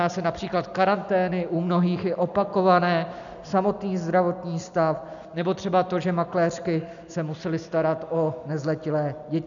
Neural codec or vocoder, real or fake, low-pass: none; real; 7.2 kHz